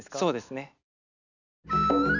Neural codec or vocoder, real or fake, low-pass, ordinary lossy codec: none; real; 7.2 kHz; none